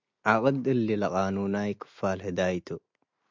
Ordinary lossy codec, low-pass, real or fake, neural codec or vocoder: MP3, 64 kbps; 7.2 kHz; real; none